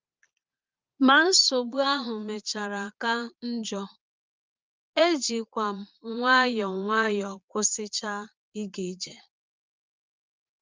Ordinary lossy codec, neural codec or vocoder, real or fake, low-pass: Opus, 32 kbps; vocoder, 22.05 kHz, 80 mel bands, Vocos; fake; 7.2 kHz